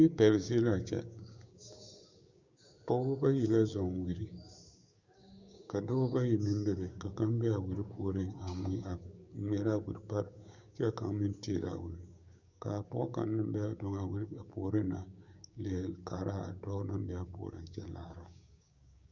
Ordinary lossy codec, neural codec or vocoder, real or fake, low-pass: Opus, 64 kbps; codec, 16 kHz, 6 kbps, DAC; fake; 7.2 kHz